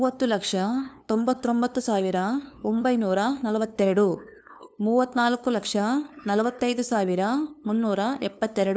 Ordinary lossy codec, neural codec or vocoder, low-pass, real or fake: none; codec, 16 kHz, 2 kbps, FunCodec, trained on LibriTTS, 25 frames a second; none; fake